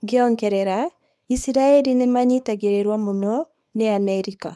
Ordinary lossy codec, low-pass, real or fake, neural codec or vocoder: none; none; fake; codec, 24 kHz, 0.9 kbps, WavTokenizer, small release